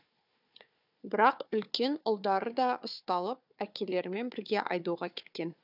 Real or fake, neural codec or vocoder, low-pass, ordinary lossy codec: fake; codec, 16 kHz, 4 kbps, FunCodec, trained on Chinese and English, 50 frames a second; 5.4 kHz; none